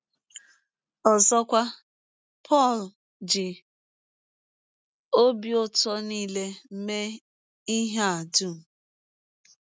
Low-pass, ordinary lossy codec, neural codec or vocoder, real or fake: none; none; none; real